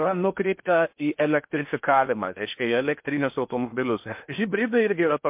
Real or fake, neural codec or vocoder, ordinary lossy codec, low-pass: fake; codec, 16 kHz in and 24 kHz out, 0.6 kbps, FocalCodec, streaming, 4096 codes; MP3, 32 kbps; 3.6 kHz